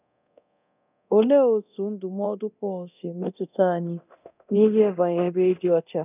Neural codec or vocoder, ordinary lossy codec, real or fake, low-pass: codec, 24 kHz, 0.9 kbps, DualCodec; none; fake; 3.6 kHz